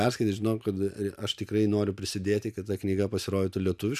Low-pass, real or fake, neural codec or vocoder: 14.4 kHz; real; none